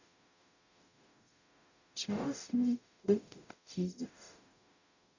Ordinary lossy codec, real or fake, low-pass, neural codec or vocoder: none; fake; 7.2 kHz; codec, 44.1 kHz, 0.9 kbps, DAC